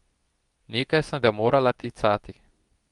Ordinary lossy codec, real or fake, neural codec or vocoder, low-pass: Opus, 32 kbps; fake; codec, 24 kHz, 0.9 kbps, WavTokenizer, medium speech release version 2; 10.8 kHz